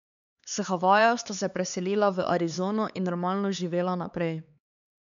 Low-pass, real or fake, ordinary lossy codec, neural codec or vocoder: 7.2 kHz; fake; none; codec, 16 kHz, 4 kbps, X-Codec, HuBERT features, trained on LibriSpeech